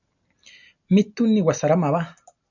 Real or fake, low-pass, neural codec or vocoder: real; 7.2 kHz; none